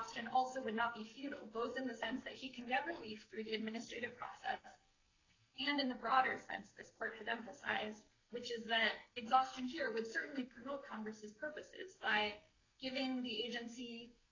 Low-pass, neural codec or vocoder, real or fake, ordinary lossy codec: 7.2 kHz; codec, 44.1 kHz, 2.6 kbps, SNAC; fake; AAC, 32 kbps